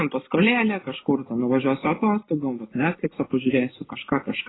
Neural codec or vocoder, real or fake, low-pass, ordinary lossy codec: none; real; 7.2 kHz; AAC, 16 kbps